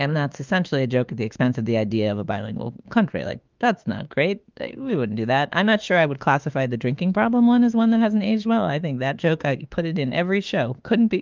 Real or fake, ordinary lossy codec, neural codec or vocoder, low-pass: fake; Opus, 24 kbps; autoencoder, 48 kHz, 32 numbers a frame, DAC-VAE, trained on Japanese speech; 7.2 kHz